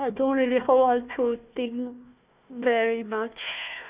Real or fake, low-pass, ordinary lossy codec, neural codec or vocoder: fake; 3.6 kHz; Opus, 64 kbps; codec, 16 kHz, 1 kbps, FunCodec, trained on Chinese and English, 50 frames a second